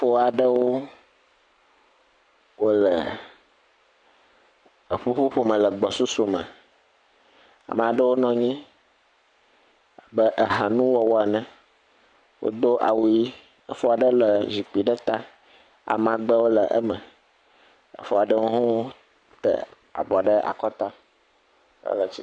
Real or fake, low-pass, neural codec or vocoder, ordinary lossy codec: fake; 9.9 kHz; codec, 44.1 kHz, 7.8 kbps, Pupu-Codec; MP3, 96 kbps